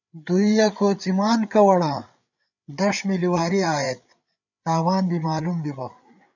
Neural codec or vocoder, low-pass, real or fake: codec, 16 kHz, 8 kbps, FreqCodec, larger model; 7.2 kHz; fake